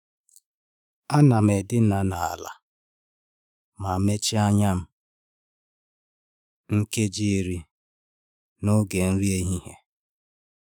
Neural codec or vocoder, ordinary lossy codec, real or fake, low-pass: autoencoder, 48 kHz, 128 numbers a frame, DAC-VAE, trained on Japanese speech; none; fake; none